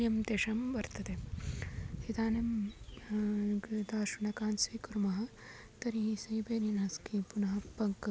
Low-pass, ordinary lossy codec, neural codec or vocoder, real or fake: none; none; none; real